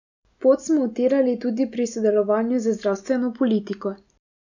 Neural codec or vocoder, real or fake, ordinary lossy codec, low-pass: none; real; none; 7.2 kHz